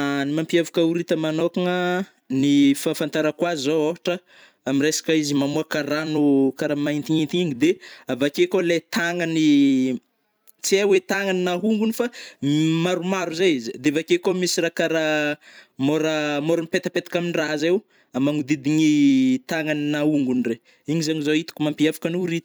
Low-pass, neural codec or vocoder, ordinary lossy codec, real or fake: none; vocoder, 44.1 kHz, 128 mel bands every 256 samples, BigVGAN v2; none; fake